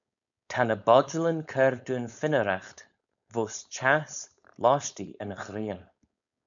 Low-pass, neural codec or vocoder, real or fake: 7.2 kHz; codec, 16 kHz, 4.8 kbps, FACodec; fake